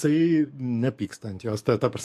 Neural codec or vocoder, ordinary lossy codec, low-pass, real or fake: codec, 44.1 kHz, 7.8 kbps, DAC; MP3, 64 kbps; 14.4 kHz; fake